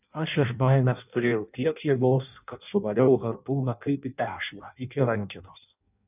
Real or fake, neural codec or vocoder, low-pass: fake; codec, 16 kHz in and 24 kHz out, 0.6 kbps, FireRedTTS-2 codec; 3.6 kHz